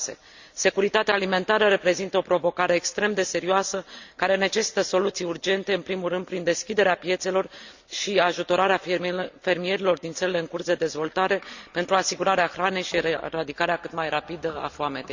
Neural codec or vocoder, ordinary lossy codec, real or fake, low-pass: none; Opus, 64 kbps; real; 7.2 kHz